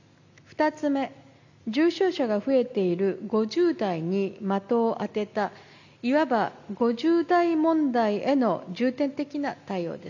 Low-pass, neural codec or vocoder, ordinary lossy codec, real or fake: 7.2 kHz; none; none; real